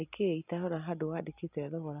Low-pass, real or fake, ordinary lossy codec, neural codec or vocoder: 3.6 kHz; real; none; none